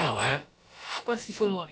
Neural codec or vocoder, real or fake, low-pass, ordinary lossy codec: codec, 16 kHz, about 1 kbps, DyCAST, with the encoder's durations; fake; none; none